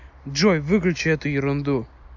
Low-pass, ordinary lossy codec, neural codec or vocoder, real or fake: 7.2 kHz; AAC, 48 kbps; none; real